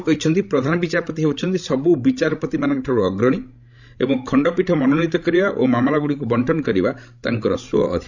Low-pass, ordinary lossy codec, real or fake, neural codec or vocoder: 7.2 kHz; none; fake; codec, 16 kHz, 16 kbps, FreqCodec, larger model